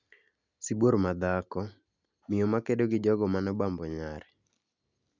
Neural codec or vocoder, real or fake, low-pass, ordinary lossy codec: none; real; 7.2 kHz; none